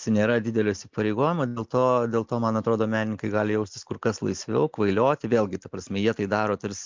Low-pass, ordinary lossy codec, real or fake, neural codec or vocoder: 7.2 kHz; MP3, 64 kbps; real; none